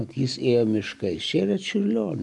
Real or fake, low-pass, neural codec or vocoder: real; 10.8 kHz; none